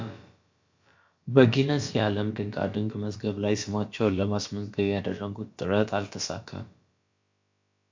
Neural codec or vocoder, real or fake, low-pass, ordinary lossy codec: codec, 16 kHz, about 1 kbps, DyCAST, with the encoder's durations; fake; 7.2 kHz; MP3, 48 kbps